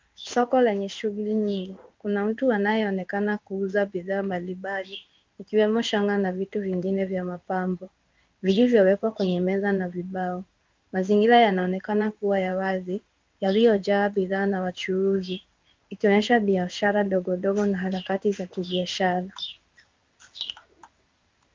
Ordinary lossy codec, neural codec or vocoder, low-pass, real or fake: Opus, 24 kbps; codec, 16 kHz in and 24 kHz out, 1 kbps, XY-Tokenizer; 7.2 kHz; fake